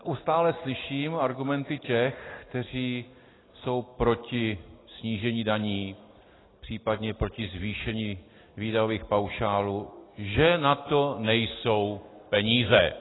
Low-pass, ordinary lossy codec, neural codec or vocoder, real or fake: 7.2 kHz; AAC, 16 kbps; none; real